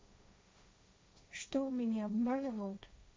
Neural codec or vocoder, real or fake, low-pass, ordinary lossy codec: codec, 16 kHz, 1.1 kbps, Voila-Tokenizer; fake; none; none